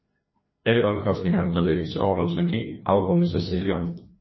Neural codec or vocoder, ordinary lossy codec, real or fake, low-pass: codec, 16 kHz, 1 kbps, FreqCodec, larger model; MP3, 24 kbps; fake; 7.2 kHz